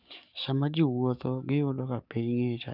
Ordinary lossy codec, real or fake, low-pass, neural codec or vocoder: none; fake; 5.4 kHz; codec, 44.1 kHz, 7.8 kbps, DAC